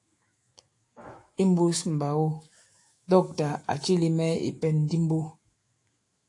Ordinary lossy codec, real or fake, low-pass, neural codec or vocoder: AAC, 48 kbps; fake; 10.8 kHz; autoencoder, 48 kHz, 128 numbers a frame, DAC-VAE, trained on Japanese speech